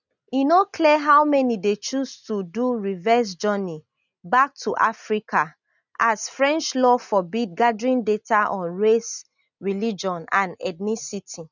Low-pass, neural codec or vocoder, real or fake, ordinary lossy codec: 7.2 kHz; none; real; none